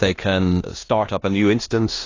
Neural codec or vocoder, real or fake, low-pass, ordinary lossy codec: codec, 16 kHz in and 24 kHz out, 0.9 kbps, LongCat-Audio-Codec, four codebook decoder; fake; 7.2 kHz; AAC, 32 kbps